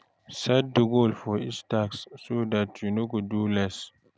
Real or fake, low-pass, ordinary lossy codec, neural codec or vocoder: real; none; none; none